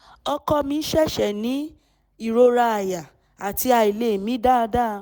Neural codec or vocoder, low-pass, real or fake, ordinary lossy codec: none; none; real; none